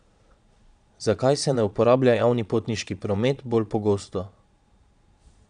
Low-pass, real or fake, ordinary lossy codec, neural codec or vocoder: 9.9 kHz; fake; none; vocoder, 22.05 kHz, 80 mel bands, Vocos